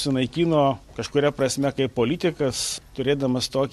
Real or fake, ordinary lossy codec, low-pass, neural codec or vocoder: real; MP3, 64 kbps; 14.4 kHz; none